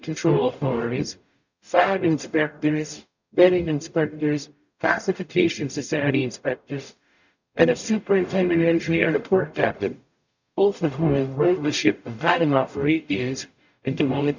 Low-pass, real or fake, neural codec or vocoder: 7.2 kHz; fake; codec, 44.1 kHz, 0.9 kbps, DAC